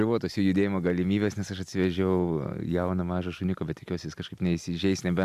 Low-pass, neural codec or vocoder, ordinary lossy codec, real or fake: 14.4 kHz; none; AAC, 96 kbps; real